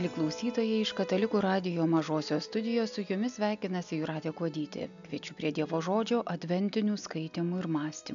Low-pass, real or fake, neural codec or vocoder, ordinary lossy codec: 7.2 kHz; real; none; MP3, 96 kbps